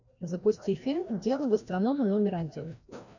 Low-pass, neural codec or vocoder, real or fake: 7.2 kHz; codec, 16 kHz, 1 kbps, FreqCodec, larger model; fake